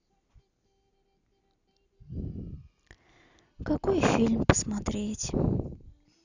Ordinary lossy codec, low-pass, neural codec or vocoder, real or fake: none; 7.2 kHz; none; real